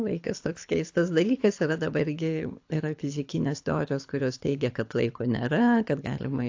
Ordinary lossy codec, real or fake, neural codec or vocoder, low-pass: AAC, 48 kbps; fake; codec, 24 kHz, 6 kbps, HILCodec; 7.2 kHz